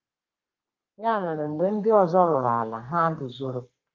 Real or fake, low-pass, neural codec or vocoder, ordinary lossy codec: fake; 7.2 kHz; codec, 32 kHz, 1.9 kbps, SNAC; Opus, 32 kbps